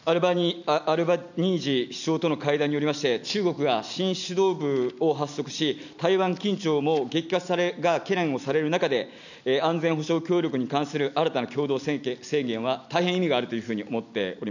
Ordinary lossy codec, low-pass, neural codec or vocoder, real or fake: none; 7.2 kHz; none; real